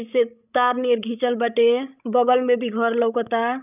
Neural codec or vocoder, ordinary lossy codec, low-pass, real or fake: codec, 16 kHz, 16 kbps, FreqCodec, larger model; none; 3.6 kHz; fake